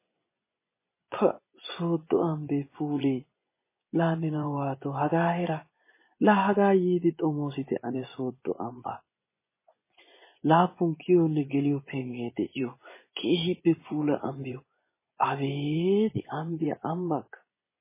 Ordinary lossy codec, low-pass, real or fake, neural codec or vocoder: MP3, 16 kbps; 3.6 kHz; fake; vocoder, 24 kHz, 100 mel bands, Vocos